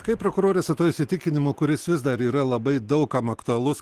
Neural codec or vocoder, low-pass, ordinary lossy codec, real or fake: autoencoder, 48 kHz, 128 numbers a frame, DAC-VAE, trained on Japanese speech; 14.4 kHz; Opus, 16 kbps; fake